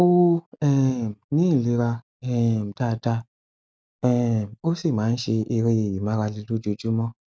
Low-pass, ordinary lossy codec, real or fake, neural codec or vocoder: none; none; real; none